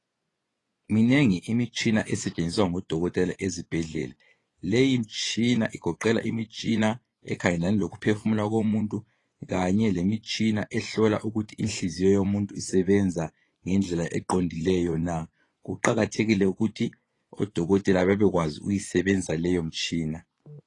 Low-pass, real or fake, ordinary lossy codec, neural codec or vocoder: 10.8 kHz; fake; AAC, 32 kbps; vocoder, 24 kHz, 100 mel bands, Vocos